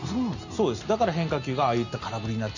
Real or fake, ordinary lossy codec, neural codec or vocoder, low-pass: real; none; none; 7.2 kHz